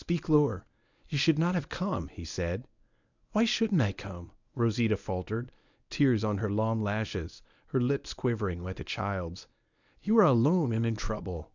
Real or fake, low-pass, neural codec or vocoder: fake; 7.2 kHz; codec, 24 kHz, 0.9 kbps, WavTokenizer, medium speech release version 1